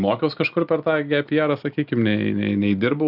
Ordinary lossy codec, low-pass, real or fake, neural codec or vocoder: AAC, 48 kbps; 5.4 kHz; real; none